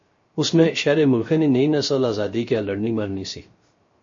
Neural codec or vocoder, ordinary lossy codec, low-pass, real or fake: codec, 16 kHz, 0.3 kbps, FocalCodec; MP3, 32 kbps; 7.2 kHz; fake